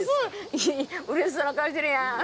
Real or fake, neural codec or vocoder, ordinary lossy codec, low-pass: real; none; none; none